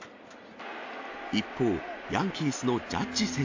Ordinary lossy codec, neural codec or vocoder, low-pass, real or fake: AAC, 48 kbps; none; 7.2 kHz; real